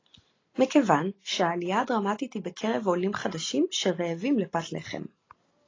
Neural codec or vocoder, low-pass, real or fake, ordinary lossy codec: none; 7.2 kHz; real; AAC, 32 kbps